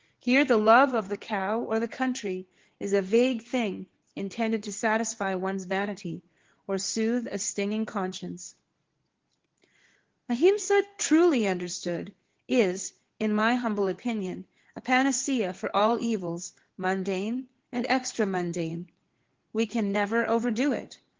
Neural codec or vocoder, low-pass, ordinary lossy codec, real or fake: codec, 16 kHz in and 24 kHz out, 2.2 kbps, FireRedTTS-2 codec; 7.2 kHz; Opus, 16 kbps; fake